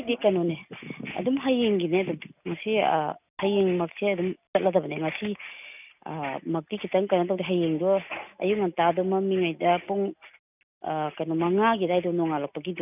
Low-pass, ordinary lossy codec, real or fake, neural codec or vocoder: 3.6 kHz; none; real; none